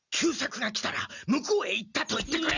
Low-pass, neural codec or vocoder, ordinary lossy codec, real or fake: 7.2 kHz; none; none; real